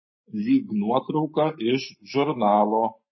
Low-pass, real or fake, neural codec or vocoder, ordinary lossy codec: 7.2 kHz; fake; codec, 44.1 kHz, 7.8 kbps, Pupu-Codec; MP3, 24 kbps